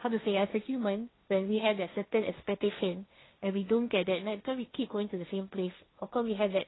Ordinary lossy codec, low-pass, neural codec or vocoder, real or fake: AAC, 16 kbps; 7.2 kHz; codec, 16 kHz, 1.1 kbps, Voila-Tokenizer; fake